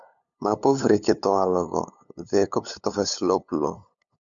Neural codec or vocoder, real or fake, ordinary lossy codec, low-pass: codec, 16 kHz, 8 kbps, FunCodec, trained on LibriTTS, 25 frames a second; fake; MP3, 64 kbps; 7.2 kHz